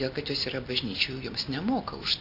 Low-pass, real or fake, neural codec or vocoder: 5.4 kHz; real; none